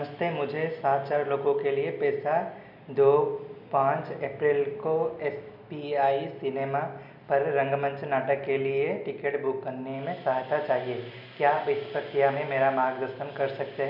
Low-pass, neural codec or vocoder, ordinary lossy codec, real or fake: 5.4 kHz; none; none; real